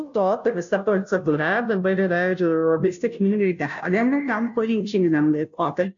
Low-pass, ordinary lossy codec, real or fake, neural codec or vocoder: 7.2 kHz; AAC, 64 kbps; fake; codec, 16 kHz, 0.5 kbps, FunCodec, trained on Chinese and English, 25 frames a second